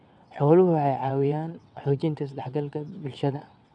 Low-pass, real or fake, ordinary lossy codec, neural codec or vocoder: 9.9 kHz; fake; none; vocoder, 22.05 kHz, 80 mel bands, WaveNeXt